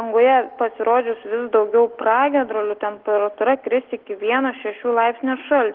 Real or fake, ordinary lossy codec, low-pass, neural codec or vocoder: real; Opus, 16 kbps; 5.4 kHz; none